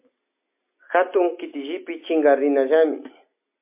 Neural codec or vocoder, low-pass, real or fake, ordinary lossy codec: none; 3.6 kHz; real; MP3, 24 kbps